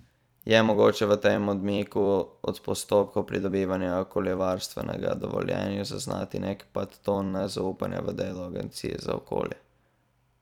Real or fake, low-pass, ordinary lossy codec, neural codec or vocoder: fake; 19.8 kHz; none; vocoder, 44.1 kHz, 128 mel bands every 256 samples, BigVGAN v2